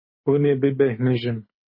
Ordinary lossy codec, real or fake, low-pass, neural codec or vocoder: MP3, 24 kbps; fake; 5.4 kHz; codec, 24 kHz, 6 kbps, HILCodec